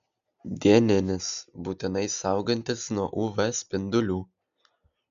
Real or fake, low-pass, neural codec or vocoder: real; 7.2 kHz; none